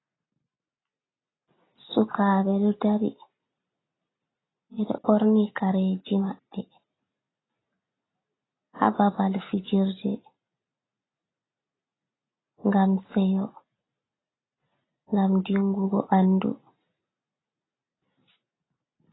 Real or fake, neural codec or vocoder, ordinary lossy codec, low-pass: real; none; AAC, 16 kbps; 7.2 kHz